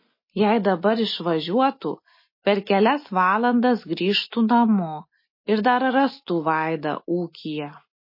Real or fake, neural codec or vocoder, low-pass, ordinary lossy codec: real; none; 5.4 kHz; MP3, 24 kbps